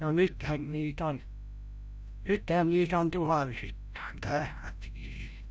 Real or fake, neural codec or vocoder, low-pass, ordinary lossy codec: fake; codec, 16 kHz, 0.5 kbps, FreqCodec, larger model; none; none